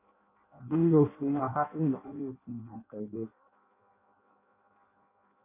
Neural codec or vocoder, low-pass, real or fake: codec, 16 kHz in and 24 kHz out, 0.6 kbps, FireRedTTS-2 codec; 3.6 kHz; fake